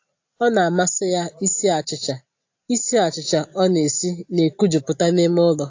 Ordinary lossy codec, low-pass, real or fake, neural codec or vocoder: AAC, 48 kbps; 7.2 kHz; real; none